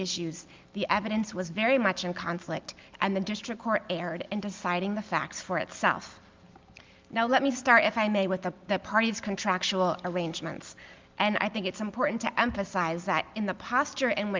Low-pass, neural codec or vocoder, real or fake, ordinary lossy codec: 7.2 kHz; none; real; Opus, 24 kbps